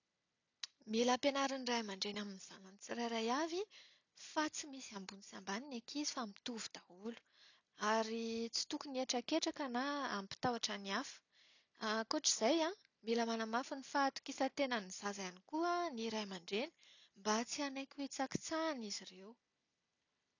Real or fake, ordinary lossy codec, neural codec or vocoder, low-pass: real; none; none; 7.2 kHz